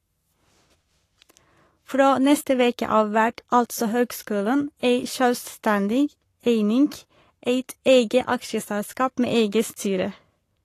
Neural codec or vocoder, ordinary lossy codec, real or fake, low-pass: codec, 44.1 kHz, 7.8 kbps, Pupu-Codec; AAC, 48 kbps; fake; 14.4 kHz